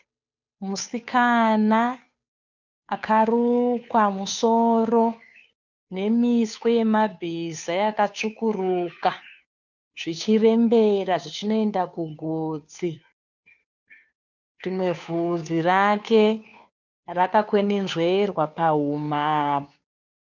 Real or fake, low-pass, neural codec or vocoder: fake; 7.2 kHz; codec, 16 kHz, 2 kbps, FunCodec, trained on Chinese and English, 25 frames a second